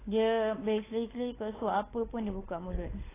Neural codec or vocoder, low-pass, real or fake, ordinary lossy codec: codec, 16 kHz, 16 kbps, FunCodec, trained on LibriTTS, 50 frames a second; 3.6 kHz; fake; AAC, 16 kbps